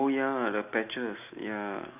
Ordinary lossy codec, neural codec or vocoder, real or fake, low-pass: none; none; real; 3.6 kHz